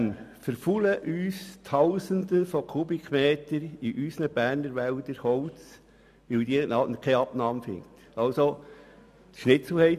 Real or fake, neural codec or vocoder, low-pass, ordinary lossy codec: real; none; 14.4 kHz; none